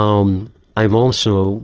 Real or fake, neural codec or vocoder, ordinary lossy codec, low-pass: fake; autoencoder, 22.05 kHz, a latent of 192 numbers a frame, VITS, trained on many speakers; Opus, 16 kbps; 7.2 kHz